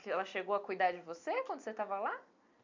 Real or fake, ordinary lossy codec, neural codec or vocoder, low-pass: real; MP3, 64 kbps; none; 7.2 kHz